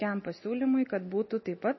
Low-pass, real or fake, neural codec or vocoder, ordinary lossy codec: 7.2 kHz; real; none; MP3, 24 kbps